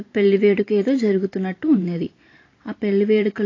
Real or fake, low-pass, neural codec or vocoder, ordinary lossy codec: fake; 7.2 kHz; vocoder, 44.1 kHz, 128 mel bands every 512 samples, BigVGAN v2; AAC, 32 kbps